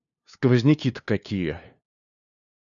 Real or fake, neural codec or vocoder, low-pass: fake; codec, 16 kHz, 2 kbps, FunCodec, trained on LibriTTS, 25 frames a second; 7.2 kHz